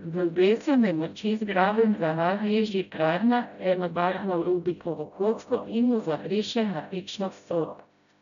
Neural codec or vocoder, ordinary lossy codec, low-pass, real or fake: codec, 16 kHz, 0.5 kbps, FreqCodec, smaller model; none; 7.2 kHz; fake